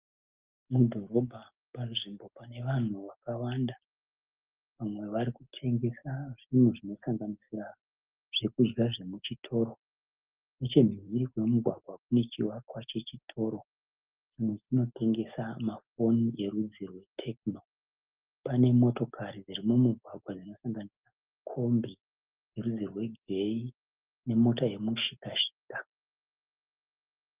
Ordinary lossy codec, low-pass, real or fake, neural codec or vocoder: Opus, 32 kbps; 3.6 kHz; real; none